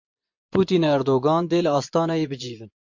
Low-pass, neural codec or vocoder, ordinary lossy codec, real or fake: 7.2 kHz; vocoder, 24 kHz, 100 mel bands, Vocos; MP3, 48 kbps; fake